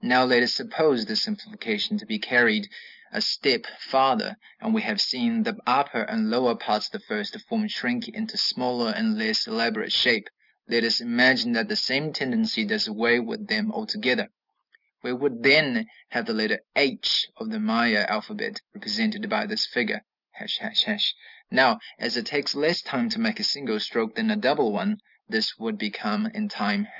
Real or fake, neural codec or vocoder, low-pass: real; none; 5.4 kHz